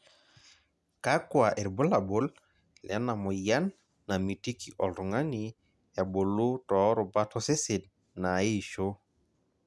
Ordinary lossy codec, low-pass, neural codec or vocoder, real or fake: none; none; none; real